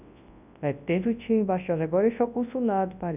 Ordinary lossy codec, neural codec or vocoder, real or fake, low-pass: none; codec, 24 kHz, 0.9 kbps, WavTokenizer, large speech release; fake; 3.6 kHz